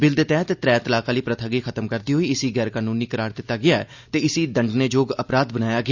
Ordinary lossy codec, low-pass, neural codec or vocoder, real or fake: Opus, 64 kbps; 7.2 kHz; none; real